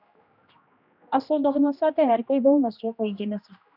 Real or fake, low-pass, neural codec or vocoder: fake; 5.4 kHz; codec, 16 kHz, 1 kbps, X-Codec, HuBERT features, trained on general audio